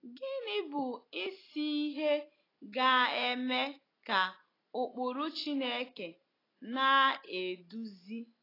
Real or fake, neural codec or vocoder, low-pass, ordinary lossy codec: real; none; 5.4 kHz; AAC, 24 kbps